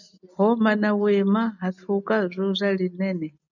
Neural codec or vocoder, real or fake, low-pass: none; real; 7.2 kHz